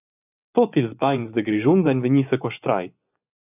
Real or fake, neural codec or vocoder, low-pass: fake; vocoder, 24 kHz, 100 mel bands, Vocos; 3.6 kHz